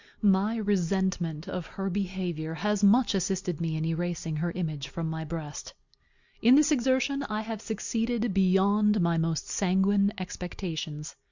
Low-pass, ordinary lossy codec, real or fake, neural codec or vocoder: 7.2 kHz; Opus, 64 kbps; real; none